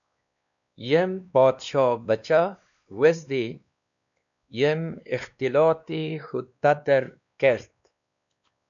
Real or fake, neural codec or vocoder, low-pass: fake; codec, 16 kHz, 2 kbps, X-Codec, WavLM features, trained on Multilingual LibriSpeech; 7.2 kHz